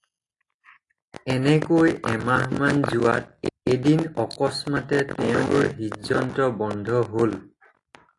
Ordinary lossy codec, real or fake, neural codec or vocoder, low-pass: AAC, 48 kbps; real; none; 10.8 kHz